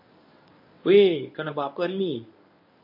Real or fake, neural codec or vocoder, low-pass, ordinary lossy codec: fake; codec, 24 kHz, 0.9 kbps, WavTokenizer, medium speech release version 1; 5.4 kHz; MP3, 32 kbps